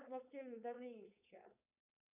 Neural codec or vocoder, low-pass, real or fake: codec, 16 kHz, 4.8 kbps, FACodec; 3.6 kHz; fake